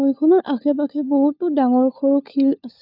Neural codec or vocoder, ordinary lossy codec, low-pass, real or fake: codec, 16 kHz, 4 kbps, FunCodec, trained on LibriTTS, 50 frames a second; none; 5.4 kHz; fake